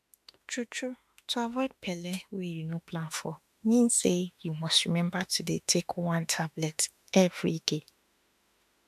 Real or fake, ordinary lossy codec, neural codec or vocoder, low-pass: fake; AAC, 96 kbps; autoencoder, 48 kHz, 32 numbers a frame, DAC-VAE, trained on Japanese speech; 14.4 kHz